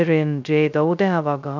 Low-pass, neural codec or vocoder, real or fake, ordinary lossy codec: 7.2 kHz; codec, 16 kHz, 0.2 kbps, FocalCodec; fake; none